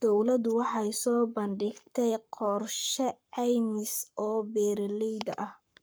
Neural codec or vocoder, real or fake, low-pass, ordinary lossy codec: codec, 44.1 kHz, 7.8 kbps, Pupu-Codec; fake; none; none